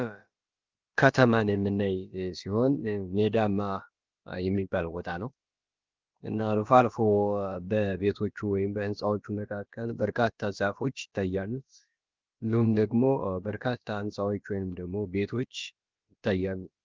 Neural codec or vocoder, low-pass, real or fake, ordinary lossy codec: codec, 16 kHz, about 1 kbps, DyCAST, with the encoder's durations; 7.2 kHz; fake; Opus, 32 kbps